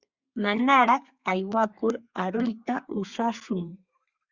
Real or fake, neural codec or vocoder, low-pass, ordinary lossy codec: fake; codec, 32 kHz, 1.9 kbps, SNAC; 7.2 kHz; Opus, 64 kbps